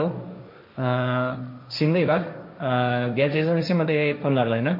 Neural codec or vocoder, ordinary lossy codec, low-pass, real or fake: codec, 16 kHz, 1.1 kbps, Voila-Tokenizer; MP3, 32 kbps; 5.4 kHz; fake